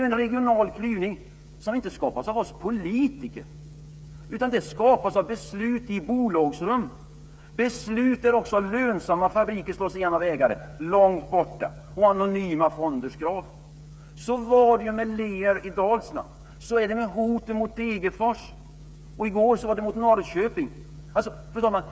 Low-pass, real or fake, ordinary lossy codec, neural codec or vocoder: none; fake; none; codec, 16 kHz, 8 kbps, FreqCodec, smaller model